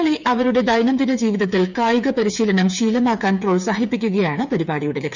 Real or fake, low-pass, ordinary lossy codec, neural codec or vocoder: fake; 7.2 kHz; none; codec, 16 kHz, 8 kbps, FreqCodec, smaller model